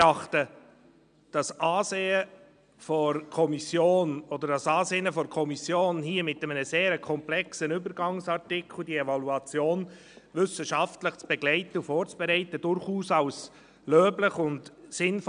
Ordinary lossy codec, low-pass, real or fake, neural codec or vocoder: none; 9.9 kHz; real; none